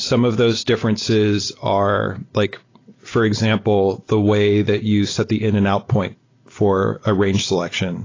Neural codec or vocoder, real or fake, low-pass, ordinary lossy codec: none; real; 7.2 kHz; AAC, 32 kbps